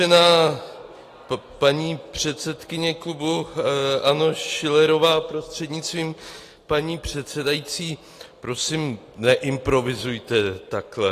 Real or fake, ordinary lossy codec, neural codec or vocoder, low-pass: real; AAC, 48 kbps; none; 14.4 kHz